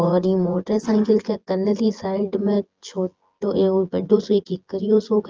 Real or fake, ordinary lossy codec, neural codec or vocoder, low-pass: fake; Opus, 24 kbps; vocoder, 24 kHz, 100 mel bands, Vocos; 7.2 kHz